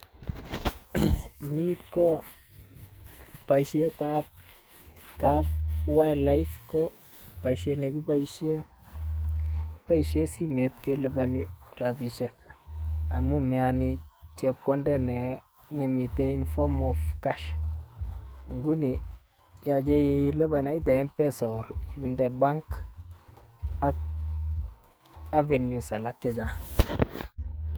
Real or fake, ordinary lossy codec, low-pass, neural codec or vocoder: fake; none; none; codec, 44.1 kHz, 2.6 kbps, SNAC